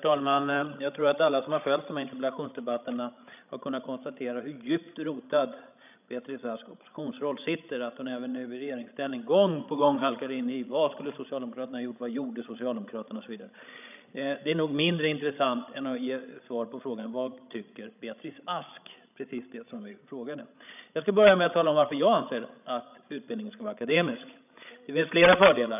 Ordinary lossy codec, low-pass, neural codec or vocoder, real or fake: none; 3.6 kHz; codec, 16 kHz, 16 kbps, FreqCodec, larger model; fake